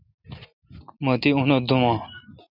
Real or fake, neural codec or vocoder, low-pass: real; none; 5.4 kHz